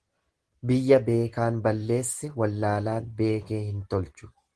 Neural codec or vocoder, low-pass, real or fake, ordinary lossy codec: none; 10.8 kHz; real; Opus, 16 kbps